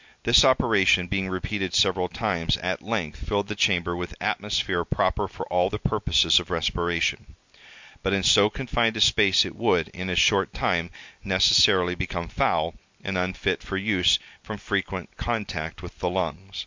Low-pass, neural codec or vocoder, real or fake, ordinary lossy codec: 7.2 kHz; none; real; MP3, 64 kbps